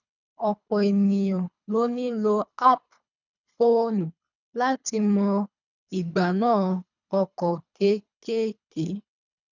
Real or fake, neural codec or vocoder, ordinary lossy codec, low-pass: fake; codec, 24 kHz, 3 kbps, HILCodec; none; 7.2 kHz